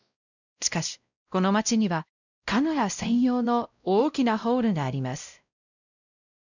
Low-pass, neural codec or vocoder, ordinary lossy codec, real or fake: 7.2 kHz; codec, 16 kHz, 0.5 kbps, X-Codec, WavLM features, trained on Multilingual LibriSpeech; none; fake